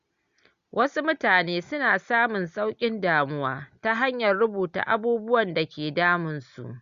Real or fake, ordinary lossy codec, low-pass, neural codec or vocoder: real; Opus, 64 kbps; 7.2 kHz; none